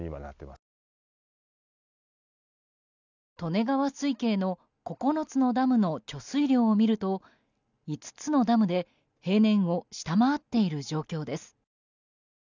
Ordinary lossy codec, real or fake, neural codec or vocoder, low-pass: none; real; none; 7.2 kHz